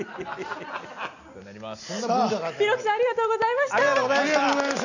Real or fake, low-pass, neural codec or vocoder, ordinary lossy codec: real; 7.2 kHz; none; none